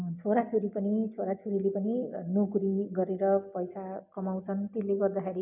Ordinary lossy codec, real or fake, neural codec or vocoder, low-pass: none; real; none; 3.6 kHz